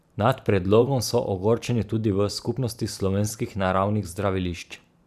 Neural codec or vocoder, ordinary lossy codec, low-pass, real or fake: vocoder, 44.1 kHz, 128 mel bands every 512 samples, BigVGAN v2; none; 14.4 kHz; fake